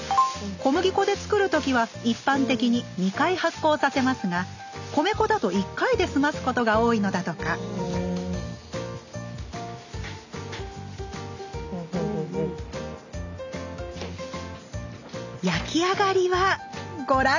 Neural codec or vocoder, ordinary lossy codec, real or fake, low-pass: none; none; real; 7.2 kHz